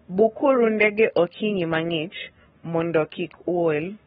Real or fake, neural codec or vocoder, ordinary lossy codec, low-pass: fake; codec, 44.1 kHz, 7.8 kbps, Pupu-Codec; AAC, 16 kbps; 19.8 kHz